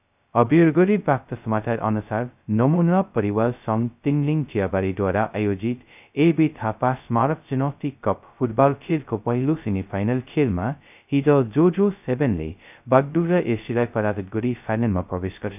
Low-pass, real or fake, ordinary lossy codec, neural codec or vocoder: 3.6 kHz; fake; none; codec, 16 kHz, 0.2 kbps, FocalCodec